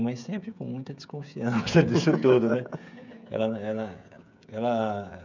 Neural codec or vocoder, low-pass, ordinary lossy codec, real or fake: codec, 16 kHz, 16 kbps, FreqCodec, smaller model; 7.2 kHz; none; fake